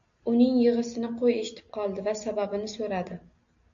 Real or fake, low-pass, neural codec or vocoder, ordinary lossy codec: real; 7.2 kHz; none; AAC, 64 kbps